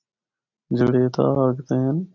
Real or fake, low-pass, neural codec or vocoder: real; 7.2 kHz; none